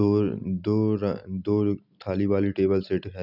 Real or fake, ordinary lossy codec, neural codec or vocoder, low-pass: real; none; none; 5.4 kHz